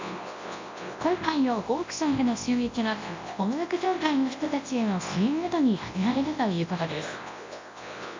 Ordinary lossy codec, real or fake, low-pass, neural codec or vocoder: MP3, 64 kbps; fake; 7.2 kHz; codec, 24 kHz, 0.9 kbps, WavTokenizer, large speech release